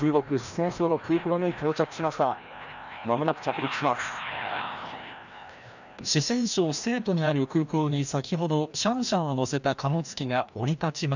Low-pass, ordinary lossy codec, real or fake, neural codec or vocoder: 7.2 kHz; none; fake; codec, 16 kHz, 1 kbps, FreqCodec, larger model